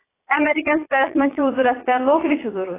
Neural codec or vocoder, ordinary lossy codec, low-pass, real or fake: vocoder, 22.05 kHz, 80 mel bands, Vocos; AAC, 16 kbps; 3.6 kHz; fake